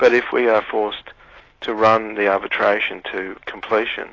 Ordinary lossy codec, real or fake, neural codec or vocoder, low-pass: AAC, 32 kbps; real; none; 7.2 kHz